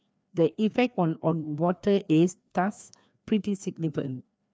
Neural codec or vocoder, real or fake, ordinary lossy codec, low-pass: codec, 16 kHz, 2 kbps, FreqCodec, larger model; fake; none; none